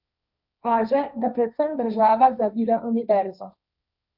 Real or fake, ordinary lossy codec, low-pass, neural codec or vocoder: fake; none; 5.4 kHz; codec, 16 kHz, 1.1 kbps, Voila-Tokenizer